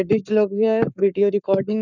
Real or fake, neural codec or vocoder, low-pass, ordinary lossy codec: fake; codec, 44.1 kHz, 7.8 kbps, Pupu-Codec; 7.2 kHz; none